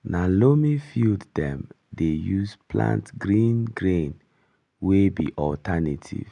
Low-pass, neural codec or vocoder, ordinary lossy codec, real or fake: 10.8 kHz; none; none; real